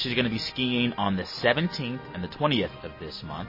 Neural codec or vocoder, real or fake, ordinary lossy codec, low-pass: none; real; MP3, 24 kbps; 5.4 kHz